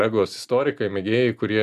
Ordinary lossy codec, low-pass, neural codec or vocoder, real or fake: MP3, 96 kbps; 14.4 kHz; none; real